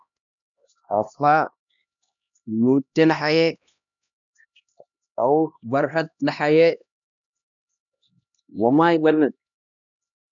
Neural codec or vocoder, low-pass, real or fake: codec, 16 kHz, 1 kbps, X-Codec, HuBERT features, trained on LibriSpeech; 7.2 kHz; fake